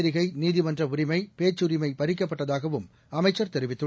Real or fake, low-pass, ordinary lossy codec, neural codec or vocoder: real; none; none; none